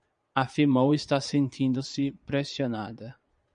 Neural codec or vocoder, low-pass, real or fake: vocoder, 22.05 kHz, 80 mel bands, Vocos; 9.9 kHz; fake